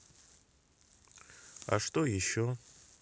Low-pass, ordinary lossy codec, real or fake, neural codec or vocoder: none; none; real; none